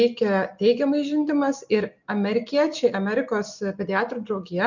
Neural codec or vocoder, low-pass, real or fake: none; 7.2 kHz; real